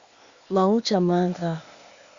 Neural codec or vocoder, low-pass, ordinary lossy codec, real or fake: codec, 16 kHz, 0.8 kbps, ZipCodec; 7.2 kHz; Opus, 64 kbps; fake